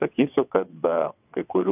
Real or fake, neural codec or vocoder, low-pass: fake; vocoder, 22.05 kHz, 80 mel bands, WaveNeXt; 3.6 kHz